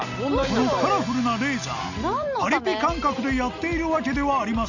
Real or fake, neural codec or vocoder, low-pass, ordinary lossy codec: real; none; 7.2 kHz; none